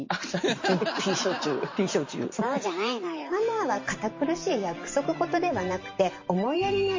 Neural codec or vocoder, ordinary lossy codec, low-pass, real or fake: vocoder, 44.1 kHz, 128 mel bands every 512 samples, BigVGAN v2; MP3, 32 kbps; 7.2 kHz; fake